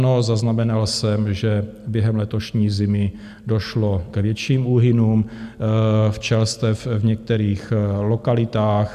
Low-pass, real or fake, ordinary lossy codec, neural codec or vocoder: 14.4 kHz; real; AAC, 96 kbps; none